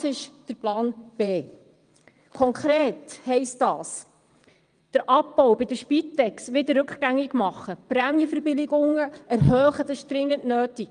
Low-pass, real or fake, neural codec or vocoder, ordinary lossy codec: 9.9 kHz; fake; vocoder, 22.05 kHz, 80 mel bands, WaveNeXt; Opus, 32 kbps